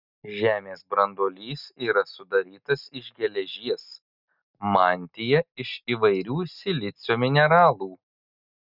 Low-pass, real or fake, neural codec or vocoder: 5.4 kHz; real; none